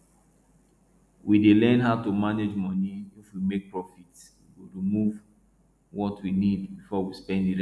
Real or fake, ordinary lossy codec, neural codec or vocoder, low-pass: real; none; none; none